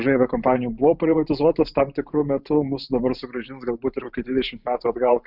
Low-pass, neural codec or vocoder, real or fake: 5.4 kHz; vocoder, 44.1 kHz, 128 mel bands every 512 samples, BigVGAN v2; fake